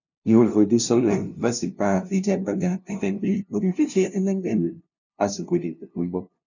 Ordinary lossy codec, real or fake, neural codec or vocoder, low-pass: none; fake; codec, 16 kHz, 0.5 kbps, FunCodec, trained on LibriTTS, 25 frames a second; 7.2 kHz